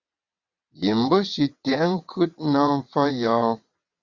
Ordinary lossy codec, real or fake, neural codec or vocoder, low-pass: Opus, 64 kbps; fake; vocoder, 22.05 kHz, 80 mel bands, WaveNeXt; 7.2 kHz